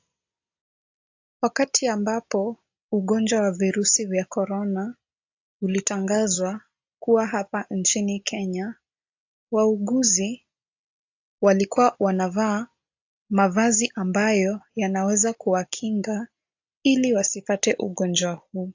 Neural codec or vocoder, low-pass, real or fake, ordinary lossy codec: none; 7.2 kHz; real; AAC, 48 kbps